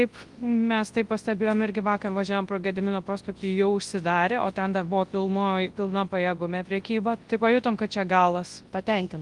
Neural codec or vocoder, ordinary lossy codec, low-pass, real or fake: codec, 24 kHz, 0.9 kbps, WavTokenizer, large speech release; Opus, 24 kbps; 10.8 kHz; fake